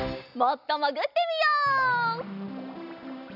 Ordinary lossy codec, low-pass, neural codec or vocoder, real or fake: none; 5.4 kHz; none; real